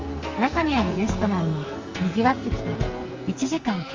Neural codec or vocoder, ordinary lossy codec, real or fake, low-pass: codec, 44.1 kHz, 2.6 kbps, SNAC; Opus, 32 kbps; fake; 7.2 kHz